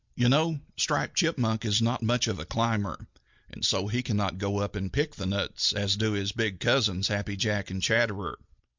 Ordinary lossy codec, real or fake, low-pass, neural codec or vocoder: MP3, 64 kbps; real; 7.2 kHz; none